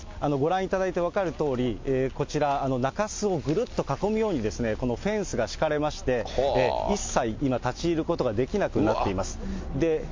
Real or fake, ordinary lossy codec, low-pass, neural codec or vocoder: real; MP3, 48 kbps; 7.2 kHz; none